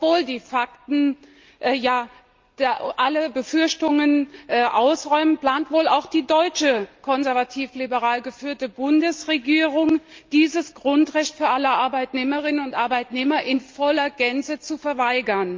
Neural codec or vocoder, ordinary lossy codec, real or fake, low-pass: none; Opus, 32 kbps; real; 7.2 kHz